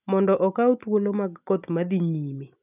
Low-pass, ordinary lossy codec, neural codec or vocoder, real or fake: 3.6 kHz; none; none; real